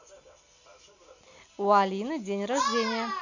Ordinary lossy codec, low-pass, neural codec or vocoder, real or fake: none; 7.2 kHz; none; real